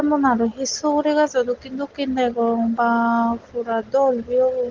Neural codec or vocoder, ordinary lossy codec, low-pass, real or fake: none; Opus, 16 kbps; 7.2 kHz; real